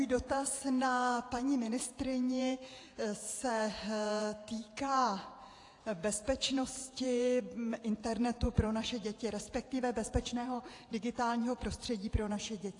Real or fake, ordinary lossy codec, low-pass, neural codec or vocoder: fake; AAC, 48 kbps; 10.8 kHz; vocoder, 48 kHz, 128 mel bands, Vocos